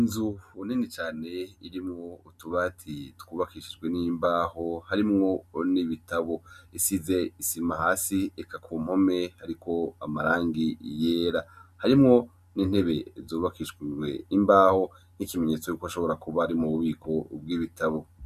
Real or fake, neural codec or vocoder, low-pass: real; none; 14.4 kHz